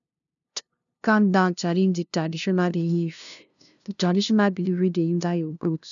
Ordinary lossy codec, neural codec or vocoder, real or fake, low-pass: none; codec, 16 kHz, 0.5 kbps, FunCodec, trained on LibriTTS, 25 frames a second; fake; 7.2 kHz